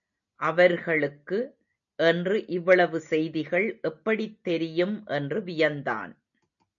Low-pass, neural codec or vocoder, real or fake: 7.2 kHz; none; real